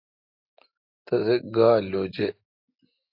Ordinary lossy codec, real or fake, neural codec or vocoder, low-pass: AAC, 32 kbps; real; none; 5.4 kHz